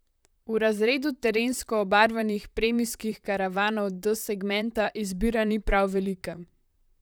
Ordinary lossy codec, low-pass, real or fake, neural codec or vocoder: none; none; fake; vocoder, 44.1 kHz, 128 mel bands, Pupu-Vocoder